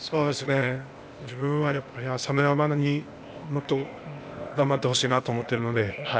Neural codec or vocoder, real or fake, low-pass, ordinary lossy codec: codec, 16 kHz, 0.8 kbps, ZipCodec; fake; none; none